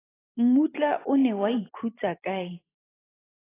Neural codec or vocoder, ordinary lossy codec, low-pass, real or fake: vocoder, 44.1 kHz, 128 mel bands every 512 samples, BigVGAN v2; AAC, 16 kbps; 3.6 kHz; fake